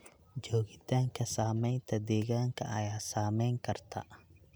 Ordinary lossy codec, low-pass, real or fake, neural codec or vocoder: none; none; real; none